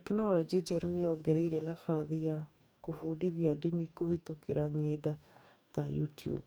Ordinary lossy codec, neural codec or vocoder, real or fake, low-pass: none; codec, 44.1 kHz, 2.6 kbps, DAC; fake; none